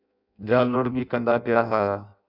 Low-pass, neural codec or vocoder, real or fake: 5.4 kHz; codec, 16 kHz in and 24 kHz out, 0.6 kbps, FireRedTTS-2 codec; fake